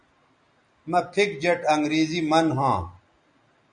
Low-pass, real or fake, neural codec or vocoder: 9.9 kHz; real; none